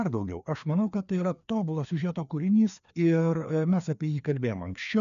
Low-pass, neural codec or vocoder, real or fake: 7.2 kHz; codec, 16 kHz, 2 kbps, FreqCodec, larger model; fake